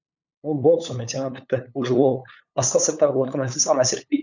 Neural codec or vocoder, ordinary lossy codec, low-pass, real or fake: codec, 16 kHz, 8 kbps, FunCodec, trained on LibriTTS, 25 frames a second; none; 7.2 kHz; fake